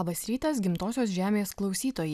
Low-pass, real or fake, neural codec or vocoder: 14.4 kHz; real; none